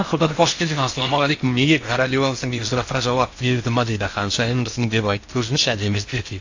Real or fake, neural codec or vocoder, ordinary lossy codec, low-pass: fake; codec, 16 kHz in and 24 kHz out, 0.6 kbps, FocalCodec, streaming, 4096 codes; AAC, 48 kbps; 7.2 kHz